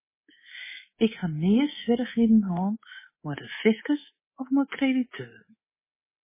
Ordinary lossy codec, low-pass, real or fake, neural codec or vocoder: MP3, 16 kbps; 3.6 kHz; fake; codec, 24 kHz, 3.1 kbps, DualCodec